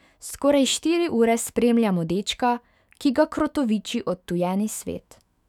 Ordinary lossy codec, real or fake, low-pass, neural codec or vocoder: none; fake; 19.8 kHz; autoencoder, 48 kHz, 128 numbers a frame, DAC-VAE, trained on Japanese speech